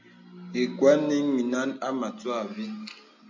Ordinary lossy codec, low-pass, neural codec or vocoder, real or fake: MP3, 64 kbps; 7.2 kHz; none; real